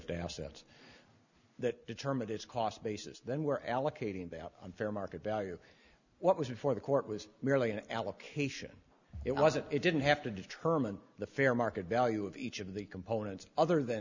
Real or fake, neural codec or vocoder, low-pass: real; none; 7.2 kHz